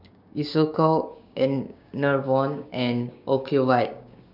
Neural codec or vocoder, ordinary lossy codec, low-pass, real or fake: codec, 16 kHz, 6 kbps, DAC; none; 5.4 kHz; fake